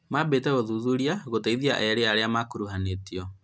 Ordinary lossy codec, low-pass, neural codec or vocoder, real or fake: none; none; none; real